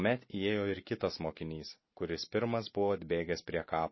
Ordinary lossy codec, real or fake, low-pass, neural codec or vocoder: MP3, 24 kbps; real; 7.2 kHz; none